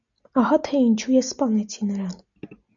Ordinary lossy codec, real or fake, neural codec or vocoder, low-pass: MP3, 96 kbps; real; none; 7.2 kHz